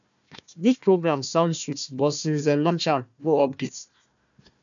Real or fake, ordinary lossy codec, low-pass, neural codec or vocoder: fake; none; 7.2 kHz; codec, 16 kHz, 1 kbps, FunCodec, trained on Chinese and English, 50 frames a second